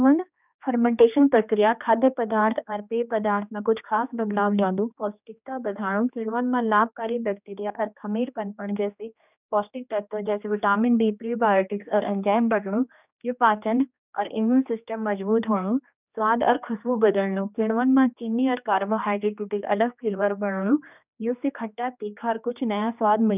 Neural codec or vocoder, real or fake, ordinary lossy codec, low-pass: codec, 16 kHz, 2 kbps, X-Codec, HuBERT features, trained on general audio; fake; none; 3.6 kHz